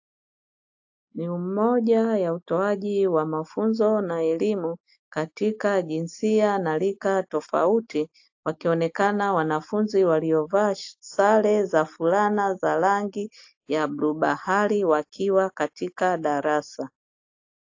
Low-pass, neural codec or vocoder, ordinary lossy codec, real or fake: 7.2 kHz; none; AAC, 48 kbps; real